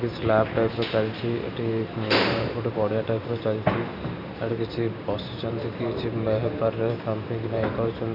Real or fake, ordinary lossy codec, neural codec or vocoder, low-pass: fake; none; vocoder, 44.1 kHz, 128 mel bands every 512 samples, BigVGAN v2; 5.4 kHz